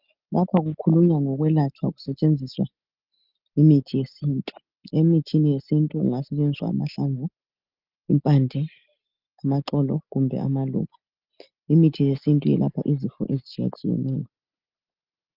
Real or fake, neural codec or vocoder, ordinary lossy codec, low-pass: real; none; Opus, 32 kbps; 5.4 kHz